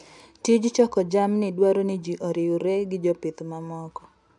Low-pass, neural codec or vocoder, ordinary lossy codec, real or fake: 10.8 kHz; none; none; real